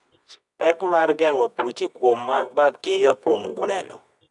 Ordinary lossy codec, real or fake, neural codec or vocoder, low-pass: none; fake; codec, 24 kHz, 0.9 kbps, WavTokenizer, medium music audio release; 10.8 kHz